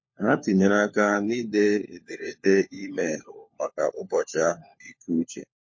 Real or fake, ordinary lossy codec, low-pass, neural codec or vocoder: fake; MP3, 32 kbps; 7.2 kHz; codec, 16 kHz, 4 kbps, FunCodec, trained on LibriTTS, 50 frames a second